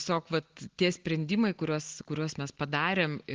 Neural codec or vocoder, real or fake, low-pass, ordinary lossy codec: none; real; 7.2 kHz; Opus, 16 kbps